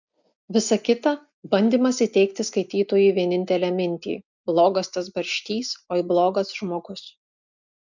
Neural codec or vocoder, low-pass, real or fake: none; 7.2 kHz; real